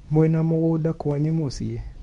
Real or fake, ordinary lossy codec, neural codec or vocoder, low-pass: fake; none; codec, 24 kHz, 0.9 kbps, WavTokenizer, medium speech release version 2; 10.8 kHz